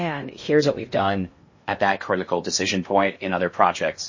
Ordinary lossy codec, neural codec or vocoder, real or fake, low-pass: MP3, 32 kbps; codec, 16 kHz in and 24 kHz out, 0.6 kbps, FocalCodec, streaming, 2048 codes; fake; 7.2 kHz